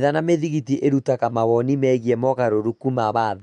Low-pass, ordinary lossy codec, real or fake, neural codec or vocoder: 9.9 kHz; MP3, 64 kbps; real; none